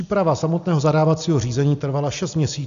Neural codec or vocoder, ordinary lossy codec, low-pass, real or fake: none; AAC, 96 kbps; 7.2 kHz; real